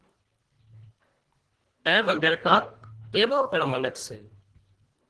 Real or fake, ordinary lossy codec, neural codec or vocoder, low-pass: fake; Opus, 16 kbps; codec, 24 kHz, 1.5 kbps, HILCodec; 10.8 kHz